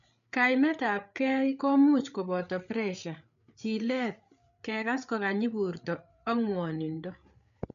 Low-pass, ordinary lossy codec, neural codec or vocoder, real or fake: 7.2 kHz; none; codec, 16 kHz, 8 kbps, FreqCodec, larger model; fake